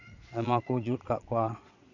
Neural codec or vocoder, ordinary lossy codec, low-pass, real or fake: vocoder, 22.05 kHz, 80 mel bands, Vocos; none; 7.2 kHz; fake